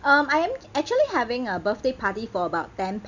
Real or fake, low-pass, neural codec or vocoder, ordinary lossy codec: real; 7.2 kHz; none; none